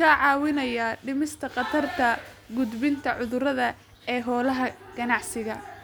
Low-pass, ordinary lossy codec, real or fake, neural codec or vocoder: none; none; real; none